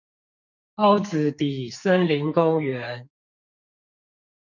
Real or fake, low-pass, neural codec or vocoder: fake; 7.2 kHz; codec, 44.1 kHz, 2.6 kbps, SNAC